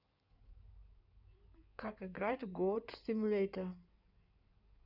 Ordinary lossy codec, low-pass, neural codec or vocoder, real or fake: none; 5.4 kHz; codec, 16 kHz in and 24 kHz out, 2.2 kbps, FireRedTTS-2 codec; fake